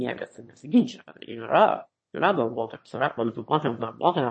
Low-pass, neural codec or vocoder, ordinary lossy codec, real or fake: 9.9 kHz; autoencoder, 22.05 kHz, a latent of 192 numbers a frame, VITS, trained on one speaker; MP3, 32 kbps; fake